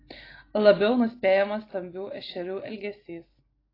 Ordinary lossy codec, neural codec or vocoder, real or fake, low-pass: AAC, 24 kbps; none; real; 5.4 kHz